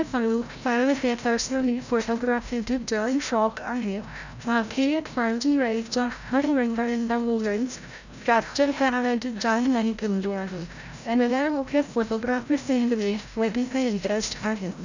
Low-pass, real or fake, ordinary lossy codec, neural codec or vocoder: 7.2 kHz; fake; none; codec, 16 kHz, 0.5 kbps, FreqCodec, larger model